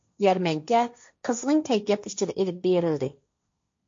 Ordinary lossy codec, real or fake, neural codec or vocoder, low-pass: MP3, 48 kbps; fake; codec, 16 kHz, 1.1 kbps, Voila-Tokenizer; 7.2 kHz